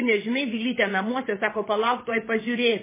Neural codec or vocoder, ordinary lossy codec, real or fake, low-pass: vocoder, 22.05 kHz, 80 mel bands, WaveNeXt; MP3, 16 kbps; fake; 3.6 kHz